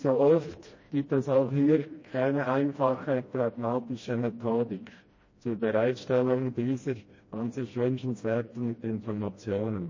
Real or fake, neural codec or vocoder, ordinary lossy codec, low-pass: fake; codec, 16 kHz, 1 kbps, FreqCodec, smaller model; MP3, 32 kbps; 7.2 kHz